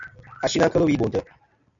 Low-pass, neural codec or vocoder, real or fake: 7.2 kHz; none; real